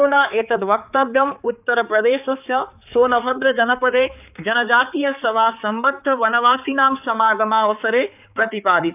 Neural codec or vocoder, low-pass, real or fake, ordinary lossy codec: codec, 16 kHz, 4 kbps, X-Codec, HuBERT features, trained on balanced general audio; 3.6 kHz; fake; none